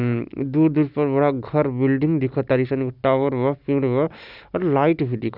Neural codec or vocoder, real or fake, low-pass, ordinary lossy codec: none; real; 5.4 kHz; none